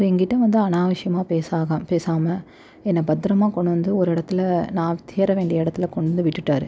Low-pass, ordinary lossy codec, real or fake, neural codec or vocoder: none; none; real; none